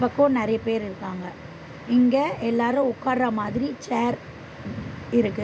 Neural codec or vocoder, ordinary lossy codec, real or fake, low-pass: none; none; real; none